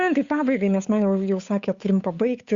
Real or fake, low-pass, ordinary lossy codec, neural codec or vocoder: fake; 7.2 kHz; Opus, 64 kbps; codec, 16 kHz, 8 kbps, FunCodec, trained on Chinese and English, 25 frames a second